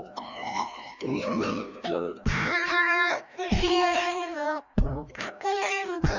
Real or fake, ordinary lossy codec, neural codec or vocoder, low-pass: fake; none; codec, 16 kHz, 1 kbps, FreqCodec, larger model; 7.2 kHz